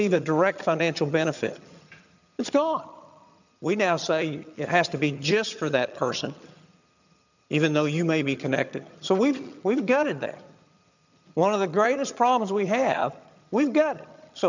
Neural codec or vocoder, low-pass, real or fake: vocoder, 22.05 kHz, 80 mel bands, HiFi-GAN; 7.2 kHz; fake